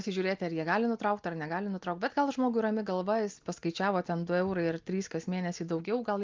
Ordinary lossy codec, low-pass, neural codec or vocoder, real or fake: Opus, 32 kbps; 7.2 kHz; none; real